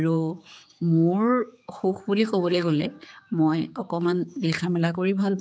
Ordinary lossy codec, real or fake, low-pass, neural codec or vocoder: none; fake; none; codec, 16 kHz, 4 kbps, X-Codec, HuBERT features, trained on general audio